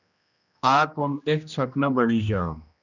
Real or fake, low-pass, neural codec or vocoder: fake; 7.2 kHz; codec, 16 kHz, 1 kbps, X-Codec, HuBERT features, trained on general audio